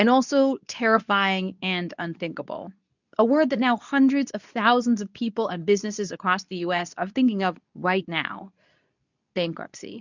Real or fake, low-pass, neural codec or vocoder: fake; 7.2 kHz; codec, 24 kHz, 0.9 kbps, WavTokenizer, medium speech release version 2